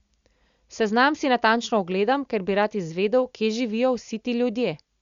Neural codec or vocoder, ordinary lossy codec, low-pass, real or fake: none; Opus, 64 kbps; 7.2 kHz; real